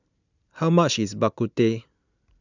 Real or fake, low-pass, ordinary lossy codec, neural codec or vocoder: real; 7.2 kHz; none; none